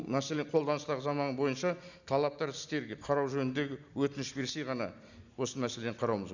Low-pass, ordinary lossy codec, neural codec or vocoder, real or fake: 7.2 kHz; none; none; real